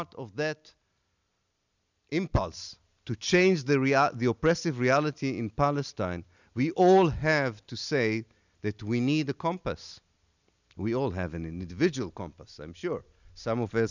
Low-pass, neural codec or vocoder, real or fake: 7.2 kHz; none; real